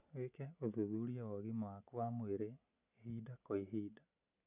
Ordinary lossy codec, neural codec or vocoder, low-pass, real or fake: none; none; 3.6 kHz; real